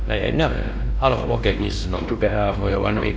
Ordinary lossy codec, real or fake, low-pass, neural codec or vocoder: none; fake; none; codec, 16 kHz, 1 kbps, X-Codec, WavLM features, trained on Multilingual LibriSpeech